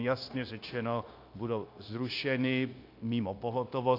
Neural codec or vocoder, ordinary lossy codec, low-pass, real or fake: codec, 16 kHz, 0.9 kbps, LongCat-Audio-Codec; AAC, 32 kbps; 5.4 kHz; fake